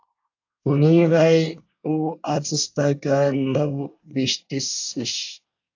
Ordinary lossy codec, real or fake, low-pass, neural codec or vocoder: AAC, 48 kbps; fake; 7.2 kHz; codec, 24 kHz, 1 kbps, SNAC